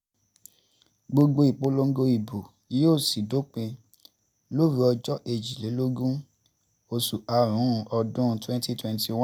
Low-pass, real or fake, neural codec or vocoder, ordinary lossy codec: none; real; none; none